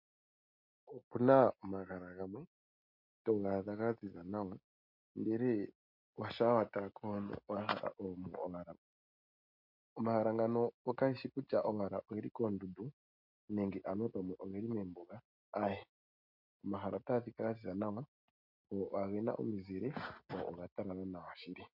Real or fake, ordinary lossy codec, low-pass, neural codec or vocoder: real; MP3, 48 kbps; 5.4 kHz; none